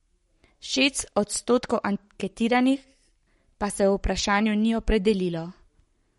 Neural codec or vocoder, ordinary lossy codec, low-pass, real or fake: codec, 44.1 kHz, 7.8 kbps, Pupu-Codec; MP3, 48 kbps; 19.8 kHz; fake